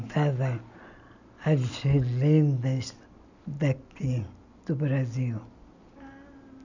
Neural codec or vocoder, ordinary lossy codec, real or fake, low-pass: none; none; real; 7.2 kHz